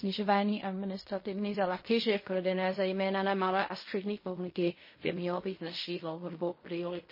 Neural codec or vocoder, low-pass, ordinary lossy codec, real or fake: codec, 16 kHz in and 24 kHz out, 0.4 kbps, LongCat-Audio-Codec, fine tuned four codebook decoder; 5.4 kHz; MP3, 24 kbps; fake